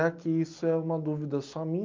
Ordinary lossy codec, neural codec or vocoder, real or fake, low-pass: Opus, 24 kbps; none; real; 7.2 kHz